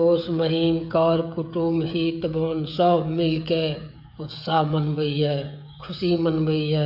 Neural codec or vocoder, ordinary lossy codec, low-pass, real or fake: codec, 16 kHz, 16 kbps, FreqCodec, smaller model; AAC, 48 kbps; 5.4 kHz; fake